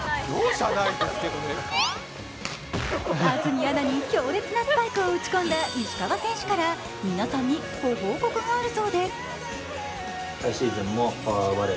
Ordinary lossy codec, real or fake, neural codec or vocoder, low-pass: none; real; none; none